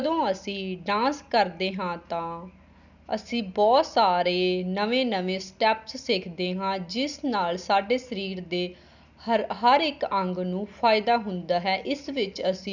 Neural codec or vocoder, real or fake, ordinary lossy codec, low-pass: none; real; none; 7.2 kHz